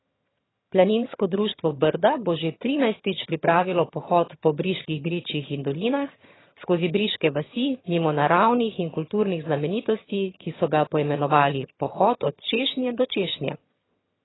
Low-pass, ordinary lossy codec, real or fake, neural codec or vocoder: 7.2 kHz; AAC, 16 kbps; fake; vocoder, 22.05 kHz, 80 mel bands, HiFi-GAN